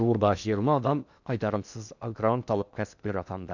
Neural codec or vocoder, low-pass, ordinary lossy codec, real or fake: codec, 16 kHz in and 24 kHz out, 0.8 kbps, FocalCodec, streaming, 65536 codes; 7.2 kHz; none; fake